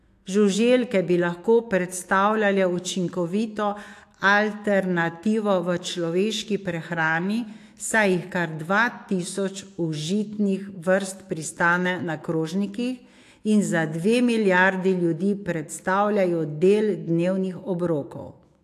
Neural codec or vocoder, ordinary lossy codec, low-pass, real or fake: autoencoder, 48 kHz, 128 numbers a frame, DAC-VAE, trained on Japanese speech; AAC, 64 kbps; 14.4 kHz; fake